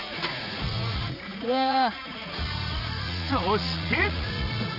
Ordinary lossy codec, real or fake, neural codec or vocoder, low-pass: none; fake; vocoder, 44.1 kHz, 80 mel bands, Vocos; 5.4 kHz